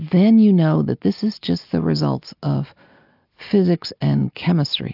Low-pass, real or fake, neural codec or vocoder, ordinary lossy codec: 5.4 kHz; real; none; AAC, 48 kbps